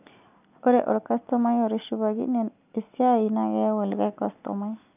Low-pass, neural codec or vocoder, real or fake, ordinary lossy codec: 3.6 kHz; none; real; none